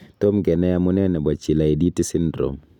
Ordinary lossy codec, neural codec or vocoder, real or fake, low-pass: none; none; real; 19.8 kHz